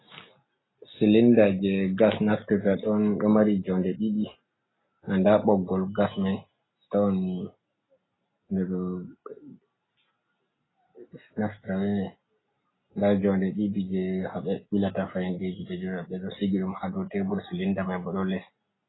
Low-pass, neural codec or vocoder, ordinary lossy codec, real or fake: 7.2 kHz; none; AAC, 16 kbps; real